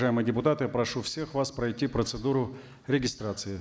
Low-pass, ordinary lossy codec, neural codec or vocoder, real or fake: none; none; none; real